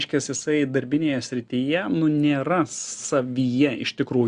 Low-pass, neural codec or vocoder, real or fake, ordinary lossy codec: 9.9 kHz; none; real; Opus, 64 kbps